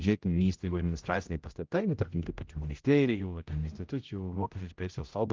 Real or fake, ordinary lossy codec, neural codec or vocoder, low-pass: fake; Opus, 24 kbps; codec, 16 kHz, 0.5 kbps, X-Codec, HuBERT features, trained on general audio; 7.2 kHz